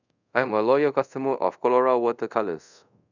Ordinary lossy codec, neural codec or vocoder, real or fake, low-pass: none; codec, 24 kHz, 0.5 kbps, DualCodec; fake; 7.2 kHz